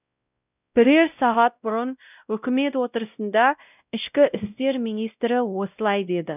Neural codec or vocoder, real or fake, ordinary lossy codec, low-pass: codec, 16 kHz, 0.5 kbps, X-Codec, WavLM features, trained on Multilingual LibriSpeech; fake; none; 3.6 kHz